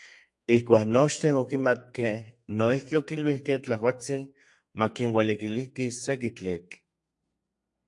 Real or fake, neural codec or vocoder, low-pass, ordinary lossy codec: fake; codec, 32 kHz, 1.9 kbps, SNAC; 10.8 kHz; AAC, 64 kbps